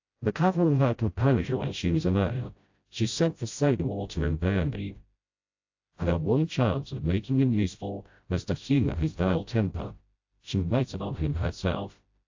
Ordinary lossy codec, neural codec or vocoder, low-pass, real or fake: AAC, 48 kbps; codec, 16 kHz, 0.5 kbps, FreqCodec, smaller model; 7.2 kHz; fake